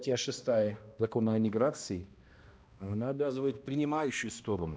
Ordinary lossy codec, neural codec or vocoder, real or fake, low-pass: none; codec, 16 kHz, 1 kbps, X-Codec, HuBERT features, trained on balanced general audio; fake; none